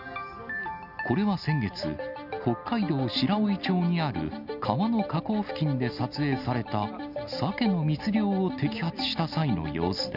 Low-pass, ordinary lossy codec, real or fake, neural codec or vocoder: 5.4 kHz; none; real; none